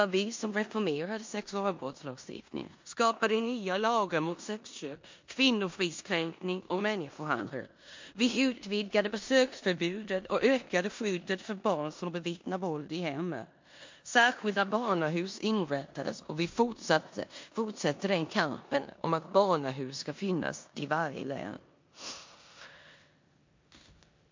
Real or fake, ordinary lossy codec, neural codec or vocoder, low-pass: fake; MP3, 48 kbps; codec, 16 kHz in and 24 kHz out, 0.9 kbps, LongCat-Audio-Codec, four codebook decoder; 7.2 kHz